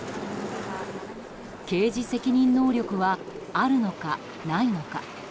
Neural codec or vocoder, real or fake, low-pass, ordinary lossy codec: none; real; none; none